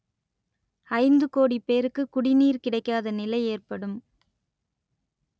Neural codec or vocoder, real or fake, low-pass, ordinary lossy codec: none; real; none; none